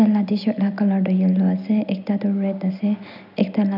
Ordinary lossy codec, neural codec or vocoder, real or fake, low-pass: none; none; real; 5.4 kHz